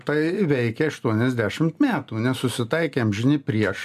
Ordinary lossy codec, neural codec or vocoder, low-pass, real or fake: MP3, 96 kbps; none; 14.4 kHz; real